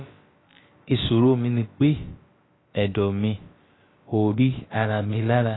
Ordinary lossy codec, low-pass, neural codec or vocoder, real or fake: AAC, 16 kbps; 7.2 kHz; codec, 16 kHz, about 1 kbps, DyCAST, with the encoder's durations; fake